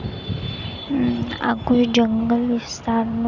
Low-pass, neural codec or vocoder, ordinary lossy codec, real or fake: 7.2 kHz; none; none; real